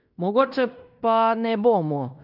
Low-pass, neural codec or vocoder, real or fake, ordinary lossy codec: 5.4 kHz; codec, 16 kHz in and 24 kHz out, 0.9 kbps, LongCat-Audio-Codec, fine tuned four codebook decoder; fake; none